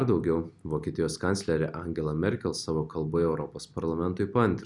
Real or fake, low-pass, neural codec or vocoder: real; 10.8 kHz; none